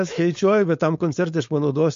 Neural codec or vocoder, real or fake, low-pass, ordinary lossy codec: codec, 16 kHz, 4.8 kbps, FACodec; fake; 7.2 kHz; MP3, 48 kbps